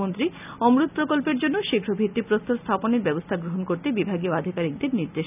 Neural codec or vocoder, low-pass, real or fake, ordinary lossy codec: none; 3.6 kHz; real; none